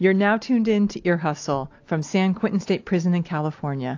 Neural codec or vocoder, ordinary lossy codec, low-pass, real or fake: none; AAC, 48 kbps; 7.2 kHz; real